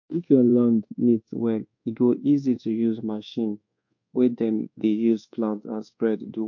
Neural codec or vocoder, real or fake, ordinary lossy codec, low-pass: codec, 24 kHz, 1.2 kbps, DualCodec; fake; MP3, 48 kbps; 7.2 kHz